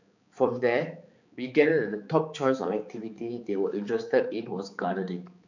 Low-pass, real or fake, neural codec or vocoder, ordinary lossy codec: 7.2 kHz; fake; codec, 16 kHz, 4 kbps, X-Codec, HuBERT features, trained on balanced general audio; none